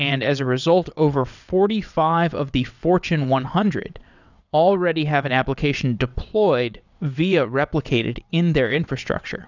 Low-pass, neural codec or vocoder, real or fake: 7.2 kHz; vocoder, 22.05 kHz, 80 mel bands, WaveNeXt; fake